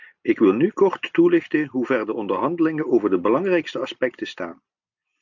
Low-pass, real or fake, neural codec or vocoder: 7.2 kHz; real; none